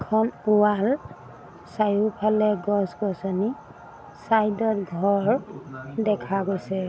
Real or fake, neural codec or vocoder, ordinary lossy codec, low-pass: real; none; none; none